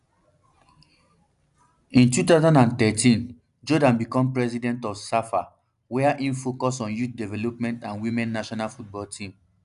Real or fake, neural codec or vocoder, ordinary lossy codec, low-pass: real; none; none; 10.8 kHz